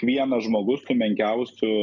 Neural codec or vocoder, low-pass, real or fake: none; 7.2 kHz; real